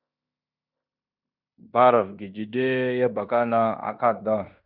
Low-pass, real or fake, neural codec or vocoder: 5.4 kHz; fake; codec, 16 kHz in and 24 kHz out, 0.9 kbps, LongCat-Audio-Codec, fine tuned four codebook decoder